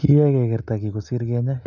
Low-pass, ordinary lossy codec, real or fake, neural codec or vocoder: 7.2 kHz; none; real; none